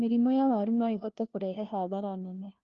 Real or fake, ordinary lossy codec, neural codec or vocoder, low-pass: fake; Opus, 32 kbps; codec, 16 kHz, 1 kbps, FunCodec, trained on LibriTTS, 50 frames a second; 7.2 kHz